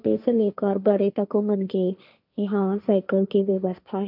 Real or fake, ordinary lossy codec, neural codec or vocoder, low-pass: fake; none; codec, 16 kHz, 1.1 kbps, Voila-Tokenizer; 5.4 kHz